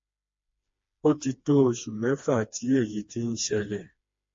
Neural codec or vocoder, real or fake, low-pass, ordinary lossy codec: codec, 16 kHz, 2 kbps, FreqCodec, smaller model; fake; 7.2 kHz; MP3, 32 kbps